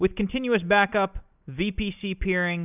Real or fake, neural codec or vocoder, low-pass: real; none; 3.6 kHz